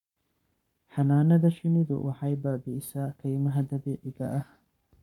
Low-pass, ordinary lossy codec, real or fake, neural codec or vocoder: 19.8 kHz; none; fake; codec, 44.1 kHz, 7.8 kbps, Pupu-Codec